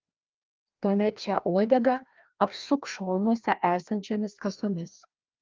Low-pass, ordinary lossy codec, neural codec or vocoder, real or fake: 7.2 kHz; Opus, 32 kbps; codec, 16 kHz, 1 kbps, FreqCodec, larger model; fake